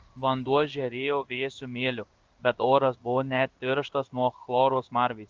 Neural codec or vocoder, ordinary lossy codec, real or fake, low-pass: codec, 16 kHz in and 24 kHz out, 1 kbps, XY-Tokenizer; Opus, 24 kbps; fake; 7.2 kHz